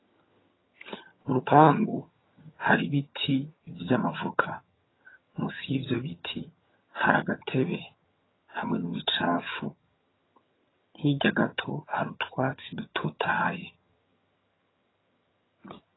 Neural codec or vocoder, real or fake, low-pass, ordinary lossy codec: vocoder, 22.05 kHz, 80 mel bands, HiFi-GAN; fake; 7.2 kHz; AAC, 16 kbps